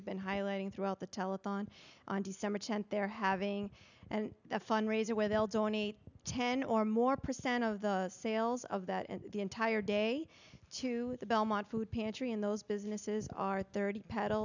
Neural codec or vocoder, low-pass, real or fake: none; 7.2 kHz; real